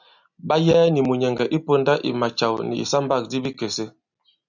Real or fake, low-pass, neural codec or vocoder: real; 7.2 kHz; none